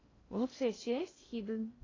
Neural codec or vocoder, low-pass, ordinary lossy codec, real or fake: codec, 16 kHz in and 24 kHz out, 0.6 kbps, FocalCodec, streaming, 2048 codes; 7.2 kHz; AAC, 32 kbps; fake